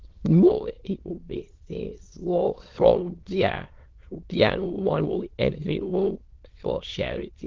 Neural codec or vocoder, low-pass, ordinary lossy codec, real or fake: autoencoder, 22.05 kHz, a latent of 192 numbers a frame, VITS, trained on many speakers; 7.2 kHz; Opus, 16 kbps; fake